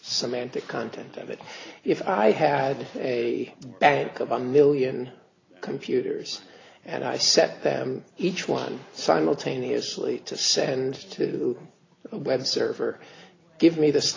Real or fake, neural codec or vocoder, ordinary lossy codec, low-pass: real; none; AAC, 32 kbps; 7.2 kHz